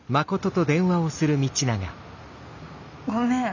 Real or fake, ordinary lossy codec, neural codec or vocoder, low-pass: real; none; none; 7.2 kHz